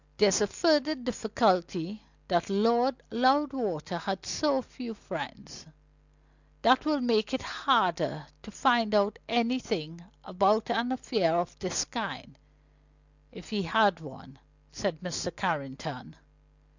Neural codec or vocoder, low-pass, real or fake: none; 7.2 kHz; real